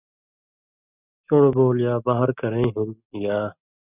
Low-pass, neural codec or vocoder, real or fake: 3.6 kHz; none; real